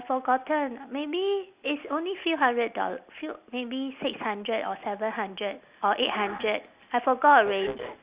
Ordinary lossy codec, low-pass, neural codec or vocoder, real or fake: Opus, 64 kbps; 3.6 kHz; none; real